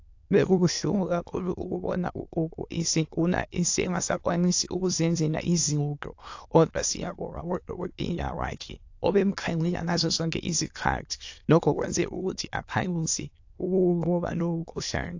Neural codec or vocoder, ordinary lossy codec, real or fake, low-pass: autoencoder, 22.05 kHz, a latent of 192 numbers a frame, VITS, trained on many speakers; AAC, 48 kbps; fake; 7.2 kHz